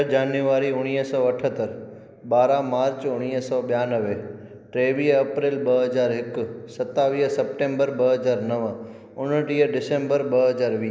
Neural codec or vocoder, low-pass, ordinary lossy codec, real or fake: none; none; none; real